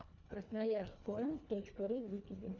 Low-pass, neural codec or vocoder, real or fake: 7.2 kHz; codec, 24 kHz, 1.5 kbps, HILCodec; fake